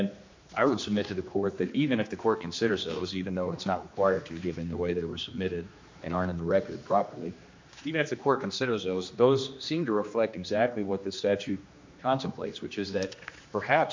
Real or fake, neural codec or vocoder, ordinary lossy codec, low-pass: fake; codec, 16 kHz, 2 kbps, X-Codec, HuBERT features, trained on general audio; MP3, 48 kbps; 7.2 kHz